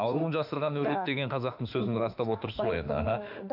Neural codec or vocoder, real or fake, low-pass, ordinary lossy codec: autoencoder, 48 kHz, 32 numbers a frame, DAC-VAE, trained on Japanese speech; fake; 5.4 kHz; none